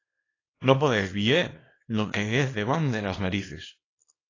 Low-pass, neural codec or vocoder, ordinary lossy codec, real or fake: 7.2 kHz; codec, 24 kHz, 0.9 kbps, WavTokenizer, small release; AAC, 32 kbps; fake